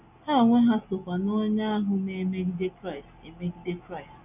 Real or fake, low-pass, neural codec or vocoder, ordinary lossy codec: real; 3.6 kHz; none; none